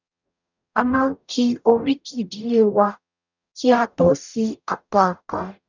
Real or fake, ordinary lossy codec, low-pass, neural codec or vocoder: fake; none; 7.2 kHz; codec, 44.1 kHz, 0.9 kbps, DAC